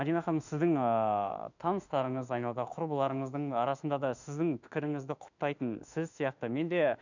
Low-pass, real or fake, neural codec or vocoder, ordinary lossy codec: 7.2 kHz; fake; autoencoder, 48 kHz, 32 numbers a frame, DAC-VAE, trained on Japanese speech; none